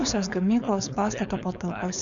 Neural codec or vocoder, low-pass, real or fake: codec, 16 kHz, 4.8 kbps, FACodec; 7.2 kHz; fake